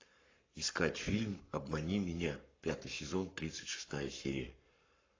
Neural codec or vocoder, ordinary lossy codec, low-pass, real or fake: codec, 44.1 kHz, 7.8 kbps, Pupu-Codec; AAC, 32 kbps; 7.2 kHz; fake